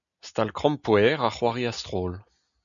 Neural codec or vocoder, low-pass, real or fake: none; 7.2 kHz; real